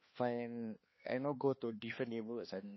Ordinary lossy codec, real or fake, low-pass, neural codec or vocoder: MP3, 24 kbps; fake; 7.2 kHz; codec, 16 kHz, 2 kbps, X-Codec, HuBERT features, trained on balanced general audio